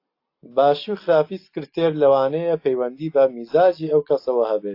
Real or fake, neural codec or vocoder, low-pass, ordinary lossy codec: real; none; 5.4 kHz; AAC, 32 kbps